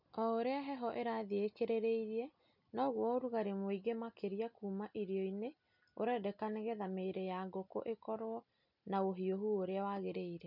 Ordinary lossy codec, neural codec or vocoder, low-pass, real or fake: none; none; 5.4 kHz; real